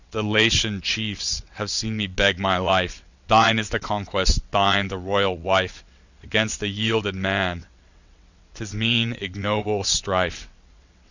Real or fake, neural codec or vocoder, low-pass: fake; vocoder, 22.05 kHz, 80 mel bands, WaveNeXt; 7.2 kHz